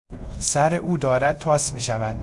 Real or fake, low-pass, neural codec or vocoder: fake; 10.8 kHz; codec, 24 kHz, 0.5 kbps, DualCodec